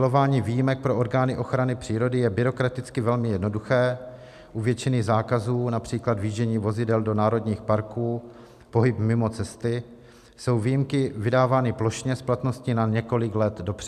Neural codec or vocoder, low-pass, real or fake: none; 14.4 kHz; real